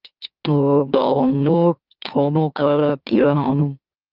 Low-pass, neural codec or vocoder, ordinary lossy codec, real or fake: 5.4 kHz; autoencoder, 44.1 kHz, a latent of 192 numbers a frame, MeloTTS; Opus, 32 kbps; fake